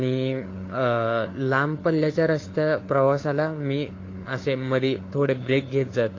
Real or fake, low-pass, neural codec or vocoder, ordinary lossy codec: fake; 7.2 kHz; codec, 16 kHz, 4 kbps, FunCodec, trained on LibriTTS, 50 frames a second; AAC, 32 kbps